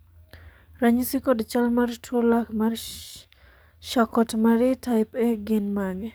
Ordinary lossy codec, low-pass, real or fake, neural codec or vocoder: none; none; fake; codec, 44.1 kHz, 7.8 kbps, DAC